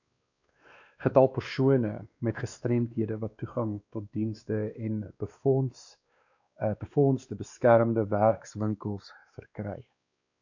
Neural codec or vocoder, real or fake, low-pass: codec, 16 kHz, 2 kbps, X-Codec, WavLM features, trained on Multilingual LibriSpeech; fake; 7.2 kHz